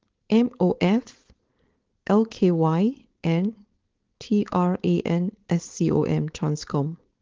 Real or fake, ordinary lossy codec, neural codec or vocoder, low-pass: fake; Opus, 16 kbps; codec, 16 kHz, 4.8 kbps, FACodec; 7.2 kHz